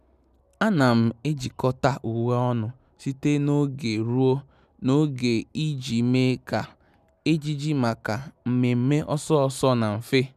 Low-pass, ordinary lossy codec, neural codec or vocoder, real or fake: 14.4 kHz; none; none; real